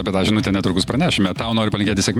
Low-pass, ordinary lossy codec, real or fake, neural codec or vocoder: 19.8 kHz; MP3, 96 kbps; fake; vocoder, 48 kHz, 128 mel bands, Vocos